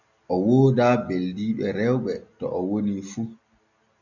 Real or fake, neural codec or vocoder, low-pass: real; none; 7.2 kHz